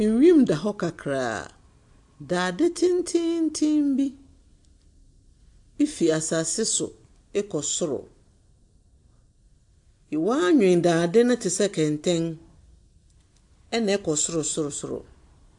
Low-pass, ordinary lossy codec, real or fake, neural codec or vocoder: 10.8 kHz; AAC, 64 kbps; real; none